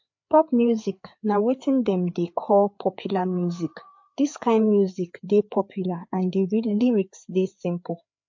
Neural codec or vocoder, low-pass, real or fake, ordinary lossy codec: codec, 16 kHz, 4 kbps, FreqCodec, larger model; 7.2 kHz; fake; MP3, 48 kbps